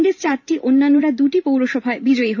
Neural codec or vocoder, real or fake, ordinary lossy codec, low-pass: vocoder, 44.1 kHz, 128 mel bands every 512 samples, BigVGAN v2; fake; none; 7.2 kHz